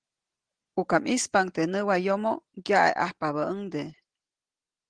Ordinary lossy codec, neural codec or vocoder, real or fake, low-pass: Opus, 16 kbps; none; real; 9.9 kHz